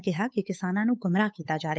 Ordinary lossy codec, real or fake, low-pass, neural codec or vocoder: none; fake; none; codec, 16 kHz, 8 kbps, FunCodec, trained on Chinese and English, 25 frames a second